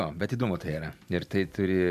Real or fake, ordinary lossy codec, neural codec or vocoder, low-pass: real; AAC, 96 kbps; none; 14.4 kHz